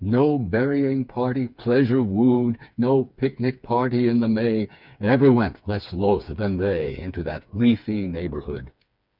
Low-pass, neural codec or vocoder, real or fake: 5.4 kHz; codec, 16 kHz, 4 kbps, FreqCodec, smaller model; fake